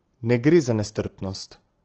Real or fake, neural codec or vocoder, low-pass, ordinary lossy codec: real; none; 7.2 kHz; Opus, 16 kbps